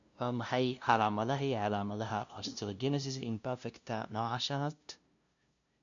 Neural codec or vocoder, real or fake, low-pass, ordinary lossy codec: codec, 16 kHz, 0.5 kbps, FunCodec, trained on LibriTTS, 25 frames a second; fake; 7.2 kHz; none